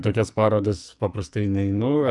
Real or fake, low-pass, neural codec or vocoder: fake; 10.8 kHz; codec, 44.1 kHz, 2.6 kbps, SNAC